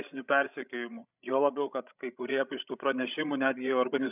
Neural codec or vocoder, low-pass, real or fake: codec, 16 kHz, 16 kbps, FreqCodec, larger model; 3.6 kHz; fake